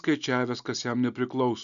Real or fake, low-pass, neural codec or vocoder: real; 7.2 kHz; none